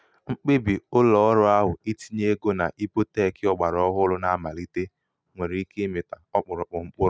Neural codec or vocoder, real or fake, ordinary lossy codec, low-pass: none; real; none; none